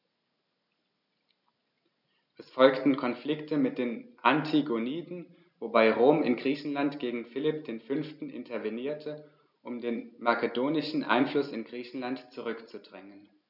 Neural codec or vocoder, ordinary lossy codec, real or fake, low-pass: none; none; real; 5.4 kHz